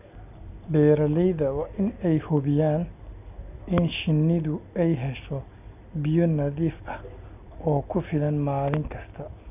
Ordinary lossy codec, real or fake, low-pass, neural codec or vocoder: none; real; 3.6 kHz; none